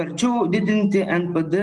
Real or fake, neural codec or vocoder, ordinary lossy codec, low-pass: real; none; Opus, 24 kbps; 10.8 kHz